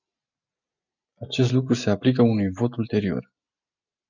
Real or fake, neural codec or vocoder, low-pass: real; none; 7.2 kHz